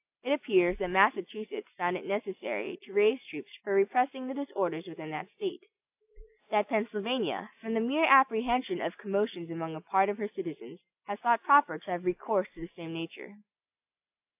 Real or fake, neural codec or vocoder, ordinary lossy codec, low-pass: real; none; AAC, 32 kbps; 3.6 kHz